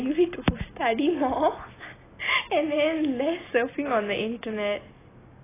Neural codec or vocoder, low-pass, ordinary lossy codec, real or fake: none; 3.6 kHz; AAC, 16 kbps; real